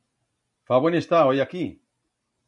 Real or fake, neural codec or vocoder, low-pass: real; none; 10.8 kHz